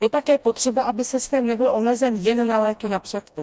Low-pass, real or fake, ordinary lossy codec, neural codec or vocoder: none; fake; none; codec, 16 kHz, 1 kbps, FreqCodec, smaller model